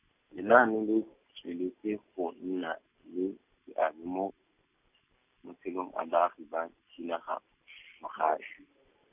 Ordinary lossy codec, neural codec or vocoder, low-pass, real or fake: none; none; 3.6 kHz; real